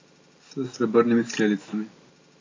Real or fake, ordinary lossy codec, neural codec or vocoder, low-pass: real; AAC, 32 kbps; none; 7.2 kHz